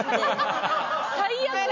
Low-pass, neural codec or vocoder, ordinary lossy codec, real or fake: 7.2 kHz; none; none; real